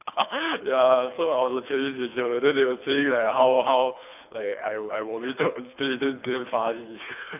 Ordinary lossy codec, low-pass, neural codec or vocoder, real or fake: none; 3.6 kHz; codec, 24 kHz, 3 kbps, HILCodec; fake